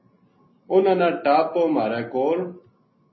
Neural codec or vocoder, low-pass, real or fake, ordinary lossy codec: none; 7.2 kHz; real; MP3, 24 kbps